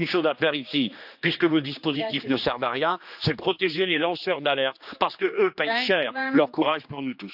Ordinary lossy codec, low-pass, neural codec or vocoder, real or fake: none; 5.4 kHz; codec, 16 kHz, 2 kbps, X-Codec, HuBERT features, trained on general audio; fake